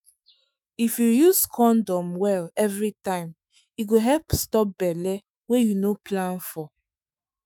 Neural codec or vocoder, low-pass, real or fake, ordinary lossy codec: autoencoder, 48 kHz, 128 numbers a frame, DAC-VAE, trained on Japanese speech; none; fake; none